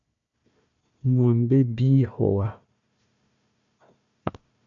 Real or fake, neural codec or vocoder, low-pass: fake; codec, 16 kHz, 1 kbps, FunCodec, trained on Chinese and English, 50 frames a second; 7.2 kHz